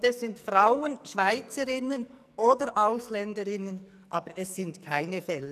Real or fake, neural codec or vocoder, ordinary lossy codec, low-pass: fake; codec, 44.1 kHz, 2.6 kbps, SNAC; none; 14.4 kHz